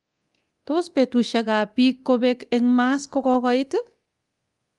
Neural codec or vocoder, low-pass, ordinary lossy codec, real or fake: codec, 24 kHz, 0.9 kbps, DualCodec; 10.8 kHz; Opus, 24 kbps; fake